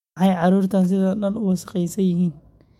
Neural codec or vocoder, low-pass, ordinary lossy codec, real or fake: autoencoder, 48 kHz, 128 numbers a frame, DAC-VAE, trained on Japanese speech; 19.8 kHz; MP3, 64 kbps; fake